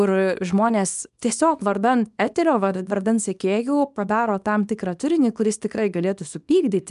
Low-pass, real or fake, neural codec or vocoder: 10.8 kHz; fake; codec, 24 kHz, 0.9 kbps, WavTokenizer, small release